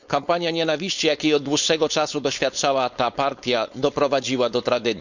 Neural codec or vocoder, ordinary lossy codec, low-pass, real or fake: codec, 16 kHz, 4.8 kbps, FACodec; none; 7.2 kHz; fake